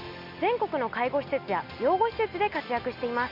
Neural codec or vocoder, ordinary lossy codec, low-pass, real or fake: none; none; 5.4 kHz; real